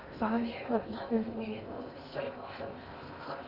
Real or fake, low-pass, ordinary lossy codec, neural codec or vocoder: fake; 5.4 kHz; Opus, 64 kbps; codec, 16 kHz in and 24 kHz out, 0.6 kbps, FocalCodec, streaming, 2048 codes